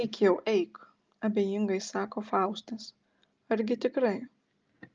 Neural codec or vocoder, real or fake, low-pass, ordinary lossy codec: none; real; 7.2 kHz; Opus, 24 kbps